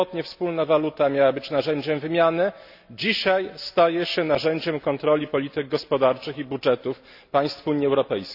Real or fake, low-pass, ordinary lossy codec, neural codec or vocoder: real; 5.4 kHz; none; none